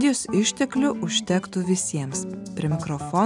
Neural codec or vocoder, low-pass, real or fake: none; 10.8 kHz; real